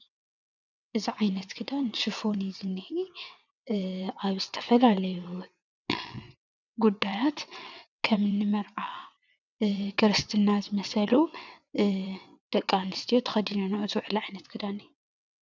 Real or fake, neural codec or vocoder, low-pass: fake; vocoder, 22.05 kHz, 80 mel bands, WaveNeXt; 7.2 kHz